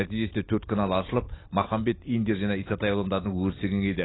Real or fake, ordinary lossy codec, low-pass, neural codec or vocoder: real; AAC, 16 kbps; 7.2 kHz; none